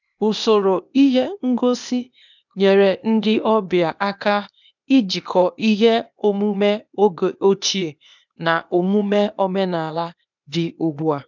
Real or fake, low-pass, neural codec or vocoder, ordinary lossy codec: fake; 7.2 kHz; codec, 16 kHz, 0.8 kbps, ZipCodec; none